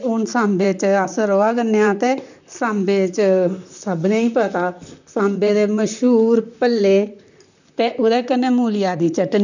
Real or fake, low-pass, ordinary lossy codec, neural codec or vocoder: fake; 7.2 kHz; none; vocoder, 44.1 kHz, 128 mel bands, Pupu-Vocoder